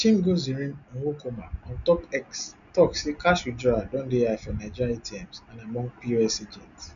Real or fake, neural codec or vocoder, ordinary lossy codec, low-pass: real; none; none; 7.2 kHz